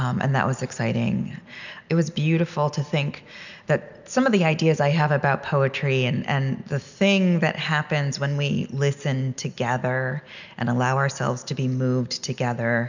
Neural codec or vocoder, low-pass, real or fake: none; 7.2 kHz; real